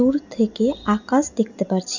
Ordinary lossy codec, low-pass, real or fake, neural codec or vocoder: none; 7.2 kHz; real; none